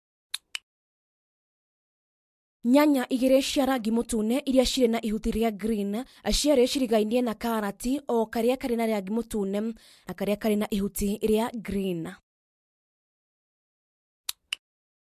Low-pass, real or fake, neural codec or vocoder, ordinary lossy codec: 14.4 kHz; real; none; MP3, 64 kbps